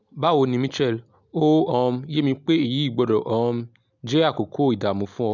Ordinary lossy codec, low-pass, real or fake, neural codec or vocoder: none; 7.2 kHz; real; none